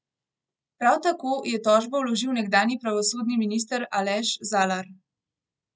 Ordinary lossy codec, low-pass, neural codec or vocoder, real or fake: none; none; none; real